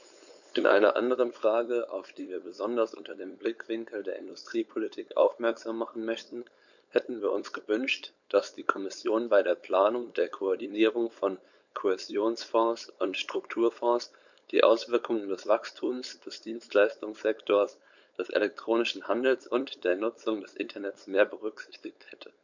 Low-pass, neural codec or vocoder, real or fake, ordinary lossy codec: 7.2 kHz; codec, 16 kHz, 4.8 kbps, FACodec; fake; none